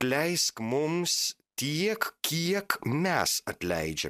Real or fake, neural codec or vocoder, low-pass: real; none; 14.4 kHz